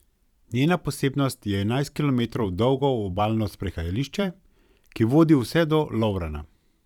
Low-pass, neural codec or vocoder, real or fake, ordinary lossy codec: 19.8 kHz; vocoder, 44.1 kHz, 128 mel bands every 512 samples, BigVGAN v2; fake; none